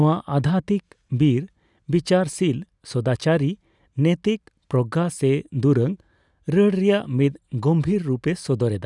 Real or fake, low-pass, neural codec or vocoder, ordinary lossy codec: real; 10.8 kHz; none; none